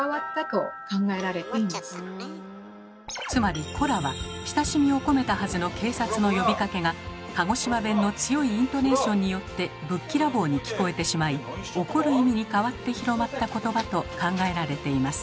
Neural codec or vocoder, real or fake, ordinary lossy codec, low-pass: none; real; none; none